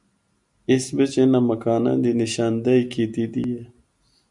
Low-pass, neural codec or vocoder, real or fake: 10.8 kHz; none; real